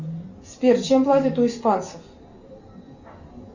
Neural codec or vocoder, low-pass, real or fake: none; 7.2 kHz; real